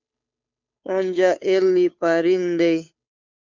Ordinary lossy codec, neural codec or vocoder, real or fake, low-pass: MP3, 64 kbps; codec, 16 kHz, 2 kbps, FunCodec, trained on Chinese and English, 25 frames a second; fake; 7.2 kHz